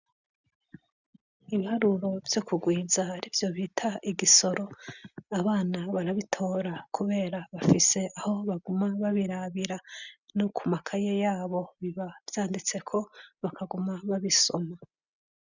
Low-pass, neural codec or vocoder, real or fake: 7.2 kHz; none; real